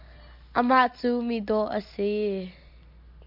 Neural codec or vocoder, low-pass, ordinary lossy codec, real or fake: none; 5.4 kHz; MP3, 48 kbps; real